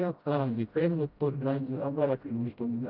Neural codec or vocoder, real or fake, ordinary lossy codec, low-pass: codec, 16 kHz, 0.5 kbps, FreqCodec, smaller model; fake; Opus, 24 kbps; 5.4 kHz